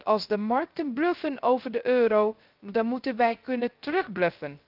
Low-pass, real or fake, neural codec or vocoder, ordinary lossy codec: 5.4 kHz; fake; codec, 16 kHz, 0.3 kbps, FocalCodec; Opus, 24 kbps